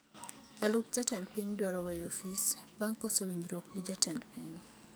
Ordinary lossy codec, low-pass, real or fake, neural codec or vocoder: none; none; fake; codec, 44.1 kHz, 2.6 kbps, SNAC